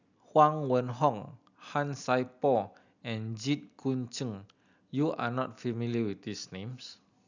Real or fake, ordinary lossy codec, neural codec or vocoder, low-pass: real; none; none; 7.2 kHz